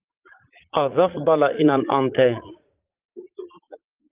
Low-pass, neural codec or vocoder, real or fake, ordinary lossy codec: 3.6 kHz; vocoder, 44.1 kHz, 80 mel bands, Vocos; fake; Opus, 24 kbps